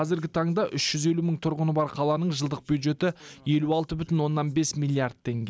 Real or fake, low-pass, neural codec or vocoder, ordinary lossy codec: real; none; none; none